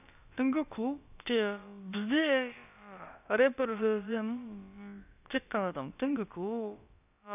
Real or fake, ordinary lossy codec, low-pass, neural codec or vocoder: fake; none; 3.6 kHz; codec, 16 kHz, about 1 kbps, DyCAST, with the encoder's durations